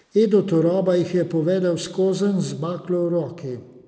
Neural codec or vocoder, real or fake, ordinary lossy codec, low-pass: none; real; none; none